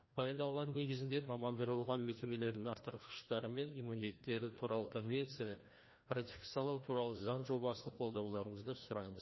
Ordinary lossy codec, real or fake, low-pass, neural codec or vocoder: MP3, 24 kbps; fake; 7.2 kHz; codec, 16 kHz, 1 kbps, FreqCodec, larger model